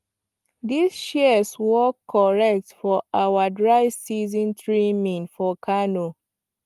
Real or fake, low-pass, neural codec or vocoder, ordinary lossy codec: real; 14.4 kHz; none; Opus, 32 kbps